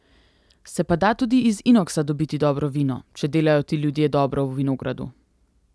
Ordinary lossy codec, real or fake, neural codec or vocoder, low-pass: none; real; none; none